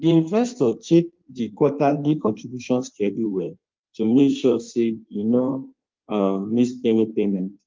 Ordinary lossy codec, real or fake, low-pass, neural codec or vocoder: Opus, 32 kbps; fake; 7.2 kHz; codec, 16 kHz in and 24 kHz out, 1.1 kbps, FireRedTTS-2 codec